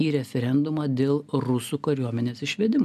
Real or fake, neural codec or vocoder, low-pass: real; none; 14.4 kHz